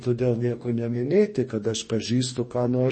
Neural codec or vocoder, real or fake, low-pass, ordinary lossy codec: codec, 44.1 kHz, 2.6 kbps, DAC; fake; 9.9 kHz; MP3, 32 kbps